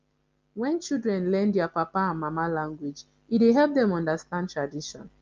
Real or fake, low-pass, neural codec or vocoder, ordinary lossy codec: real; 7.2 kHz; none; Opus, 24 kbps